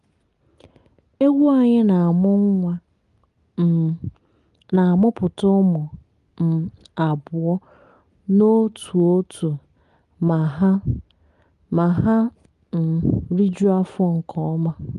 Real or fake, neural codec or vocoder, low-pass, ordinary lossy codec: real; none; 10.8 kHz; Opus, 32 kbps